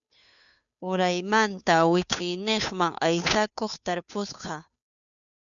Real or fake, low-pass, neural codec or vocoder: fake; 7.2 kHz; codec, 16 kHz, 2 kbps, FunCodec, trained on Chinese and English, 25 frames a second